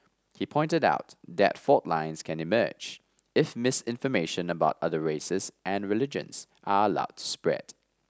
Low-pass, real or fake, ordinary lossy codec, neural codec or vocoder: none; real; none; none